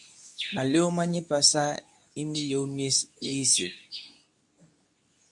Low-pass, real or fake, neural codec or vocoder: 10.8 kHz; fake; codec, 24 kHz, 0.9 kbps, WavTokenizer, medium speech release version 2